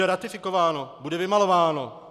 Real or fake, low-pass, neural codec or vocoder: real; 14.4 kHz; none